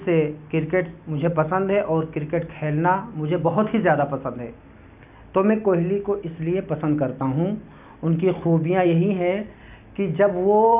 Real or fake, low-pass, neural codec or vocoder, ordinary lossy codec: real; 3.6 kHz; none; none